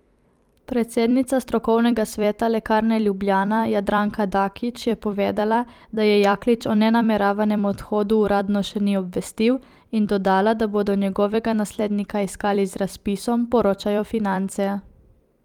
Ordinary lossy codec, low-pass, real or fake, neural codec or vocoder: Opus, 32 kbps; 19.8 kHz; fake; vocoder, 44.1 kHz, 128 mel bands every 256 samples, BigVGAN v2